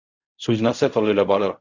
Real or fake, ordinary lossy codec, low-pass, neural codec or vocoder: fake; Opus, 64 kbps; 7.2 kHz; codec, 16 kHz in and 24 kHz out, 0.4 kbps, LongCat-Audio-Codec, fine tuned four codebook decoder